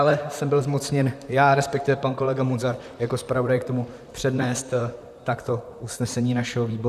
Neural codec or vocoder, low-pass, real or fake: vocoder, 44.1 kHz, 128 mel bands, Pupu-Vocoder; 14.4 kHz; fake